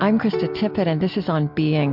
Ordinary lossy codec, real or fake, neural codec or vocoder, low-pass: MP3, 48 kbps; real; none; 5.4 kHz